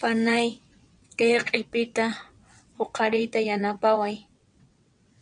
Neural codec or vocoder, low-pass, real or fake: vocoder, 22.05 kHz, 80 mel bands, WaveNeXt; 9.9 kHz; fake